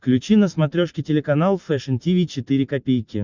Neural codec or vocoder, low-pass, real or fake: none; 7.2 kHz; real